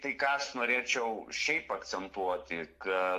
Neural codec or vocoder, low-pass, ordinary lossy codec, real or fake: none; 14.4 kHz; AAC, 64 kbps; real